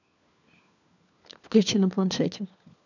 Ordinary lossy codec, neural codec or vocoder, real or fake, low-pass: none; codec, 16 kHz, 4 kbps, FunCodec, trained on LibriTTS, 50 frames a second; fake; 7.2 kHz